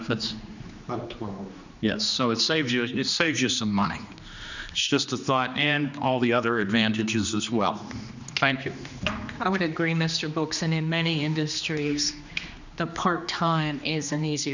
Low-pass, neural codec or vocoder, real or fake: 7.2 kHz; codec, 16 kHz, 2 kbps, X-Codec, HuBERT features, trained on general audio; fake